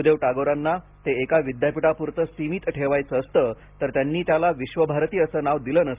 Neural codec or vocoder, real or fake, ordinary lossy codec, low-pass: none; real; Opus, 32 kbps; 3.6 kHz